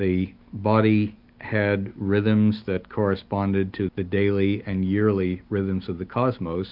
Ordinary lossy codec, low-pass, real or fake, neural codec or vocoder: AAC, 48 kbps; 5.4 kHz; real; none